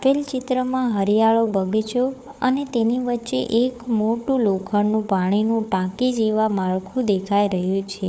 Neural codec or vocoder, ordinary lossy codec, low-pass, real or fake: codec, 16 kHz, 4 kbps, FunCodec, trained on Chinese and English, 50 frames a second; none; none; fake